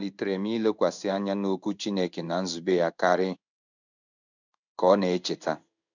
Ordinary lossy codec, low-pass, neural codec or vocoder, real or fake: none; 7.2 kHz; codec, 16 kHz in and 24 kHz out, 1 kbps, XY-Tokenizer; fake